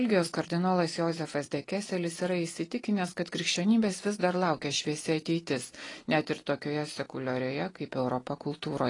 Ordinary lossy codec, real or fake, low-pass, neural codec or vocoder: AAC, 32 kbps; real; 10.8 kHz; none